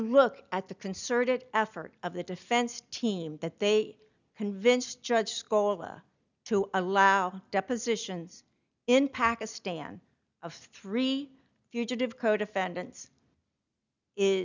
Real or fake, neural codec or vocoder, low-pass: real; none; 7.2 kHz